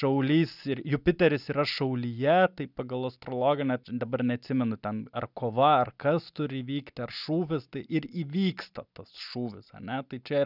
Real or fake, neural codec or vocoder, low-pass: real; none; 5.4 kHz